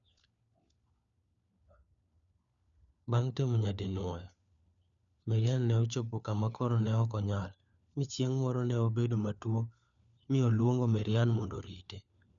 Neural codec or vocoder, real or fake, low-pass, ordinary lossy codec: codec, 16 kHz, 4 kbps, FunCodec, trained on LibriTTS, 50 frames a second; fake; 7.2 kHz; none